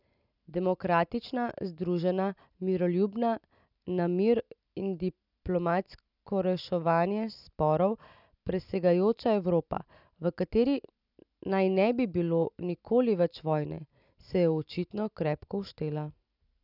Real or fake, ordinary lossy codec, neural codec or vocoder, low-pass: real; none; none; 5.4 kHz